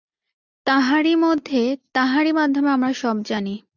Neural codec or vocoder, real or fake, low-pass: none; real; 7.2 kHz